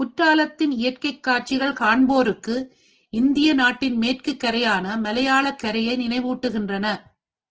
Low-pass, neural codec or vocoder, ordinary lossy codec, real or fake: 7.2 kHz; none; Opus, 16 kbps; real